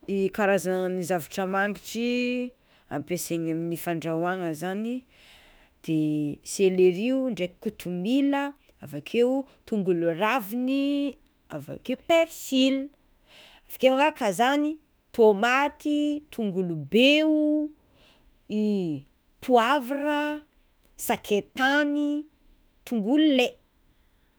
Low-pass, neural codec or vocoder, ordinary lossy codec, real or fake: none; autoencoder, 48 kHz, 32 numbers a frame, DAC-VAE, trained on Japanese speech; none; fake